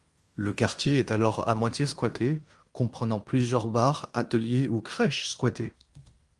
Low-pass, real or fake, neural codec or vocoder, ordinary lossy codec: 10.8 kHz; fake; codec, 16 kHz in and 24 kHz out, 0.9 kbps, LongCat-Audio-Codec, fine tuned four codebook decoder; Opus, 32 kbps